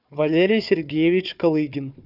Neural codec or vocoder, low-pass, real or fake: codec, 16 kHz, 4 kbps, FunCodec, trained on Chinese and English, 50 frames a second; 5.4 kHz; fake